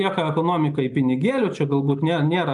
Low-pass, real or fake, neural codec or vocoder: 10.8 kHz; real; none